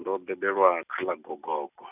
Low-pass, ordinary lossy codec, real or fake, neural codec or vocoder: 3.6 kHz; none; real; none